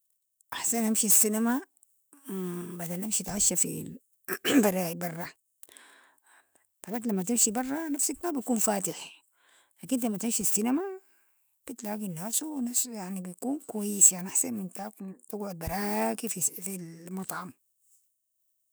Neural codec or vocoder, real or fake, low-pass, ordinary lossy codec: autoencoder, 48 kHz, 128 numbers a frame, DAC-VAE, trained on Japanese speech; fake; none; none